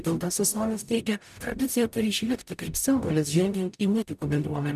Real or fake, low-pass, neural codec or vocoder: fake; 14.4 kHz; codec, 44.1 kHz, 0.9 kbps, DAC